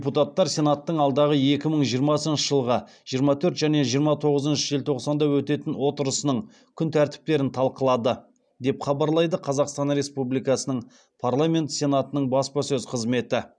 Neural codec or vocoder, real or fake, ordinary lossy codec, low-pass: none; real; none; 9.9 kHz